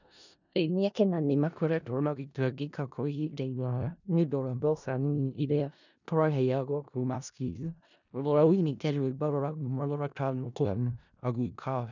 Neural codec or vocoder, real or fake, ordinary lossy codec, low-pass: codec, 16 kHz in and 24 kHz out, 0.4 kbps, LongCat-Audio-Codec, four codebook decoder; fake; none; 7.2 kHz